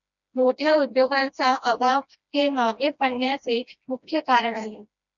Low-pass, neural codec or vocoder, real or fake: 7.2 kHz; codec, 16 kHz, 1 kbps, FreqCodec, smaller model; fake